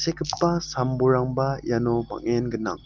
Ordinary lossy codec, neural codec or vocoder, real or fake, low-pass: Opus, 32 kbps; none; real; 7.2 kHz